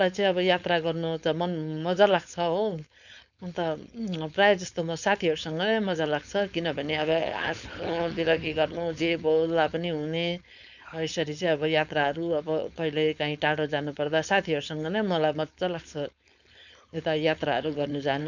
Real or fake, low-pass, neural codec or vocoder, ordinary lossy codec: fake; 7.2 kHz; codec, 16 kHz, 4.8 kbps, FACodec; none